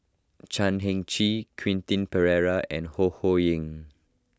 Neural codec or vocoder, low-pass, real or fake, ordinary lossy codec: none; none; real; none